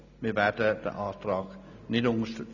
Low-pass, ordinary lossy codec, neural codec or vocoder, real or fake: 7.2 kHz; none; none; real